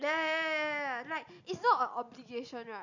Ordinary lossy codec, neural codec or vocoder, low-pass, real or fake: none; none; 7.2 kHz; real